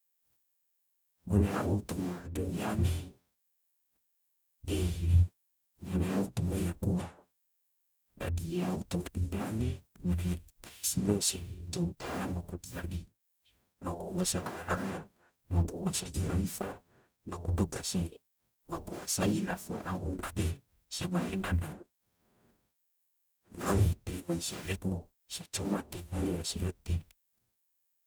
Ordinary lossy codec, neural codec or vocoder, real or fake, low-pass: none; codec, 44.1 kHz, 0.9 kbps, DAC; fake; none